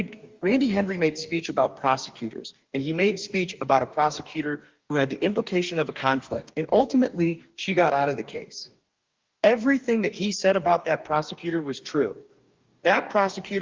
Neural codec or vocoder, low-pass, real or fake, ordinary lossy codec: codec, 44.1 kHz, 2.6 kbps, DAC; 7.2 kHz; fake; Opus, 32 kbps